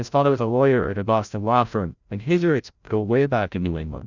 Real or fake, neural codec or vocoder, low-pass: fake; codec, 16 kHz, 0.5 kbps, FreqCodec, larger model; 7.2 kHz